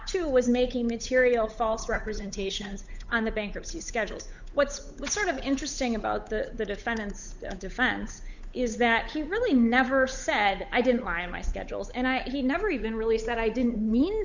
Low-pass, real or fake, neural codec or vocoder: 7.2 kHz; fake; codec, 16 kHz, 8 kbps, FunCodec, trained on Chinese and English, 25 frames a second